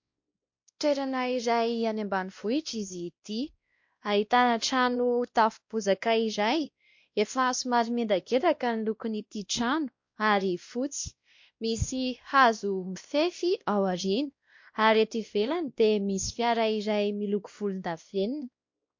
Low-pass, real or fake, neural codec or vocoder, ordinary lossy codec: 7.2 kHz; fake; codec, 16 kHz, 1 kbps, X-Codec, WavLM features, trained on Multilingual LibriSpeech; MP3, 48 kbps